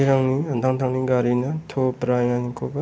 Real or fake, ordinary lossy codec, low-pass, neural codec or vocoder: real; none; none; none